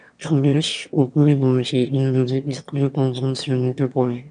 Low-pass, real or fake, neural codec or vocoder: 9.9 kHz; fake; autoencoder, 22.05 kHz, a latent of 192 numbers a frame, VITS, trained on one speaker